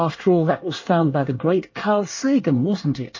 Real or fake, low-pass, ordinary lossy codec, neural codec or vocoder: fake; 7.2 kHz; MP3, 32 kbps; codec, 24 kHz, 1 kbps, SNAC